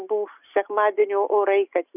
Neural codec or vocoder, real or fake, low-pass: none; real; 3.6 kHz